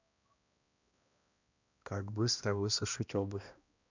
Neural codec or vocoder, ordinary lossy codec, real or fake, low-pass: codec, 16 kHz, 1 kbps, X-Codec, HuBERT features, trained on balanced general audio; none; fake; 7.2 kHz